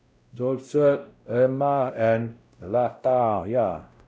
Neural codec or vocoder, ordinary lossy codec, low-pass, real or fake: codec, 16 kHz, 0.5 kbps, X-Codec, WavLM features, trained on Multilingual LibriSpeech; none; none; fake